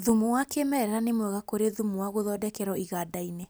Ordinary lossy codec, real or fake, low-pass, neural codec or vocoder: none; real; none; none